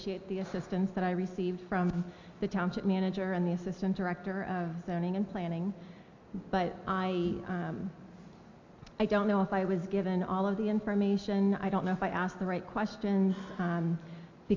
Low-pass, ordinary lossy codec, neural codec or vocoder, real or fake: 7.2 kHz; AAC, 48 kbps; none; real